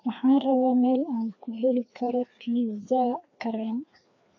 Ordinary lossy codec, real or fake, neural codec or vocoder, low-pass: AAC, 48 kbps; fake; codec, 16 kHz, 2 kbps, FreqCodec, larger model; 7.2 kHz